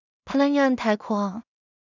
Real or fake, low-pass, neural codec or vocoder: fake; 7.2 kHz; codec, 16 kHz in and 24 kHz out, 0.4 kbps, LongCat-Audio-Codec, two codebook decoder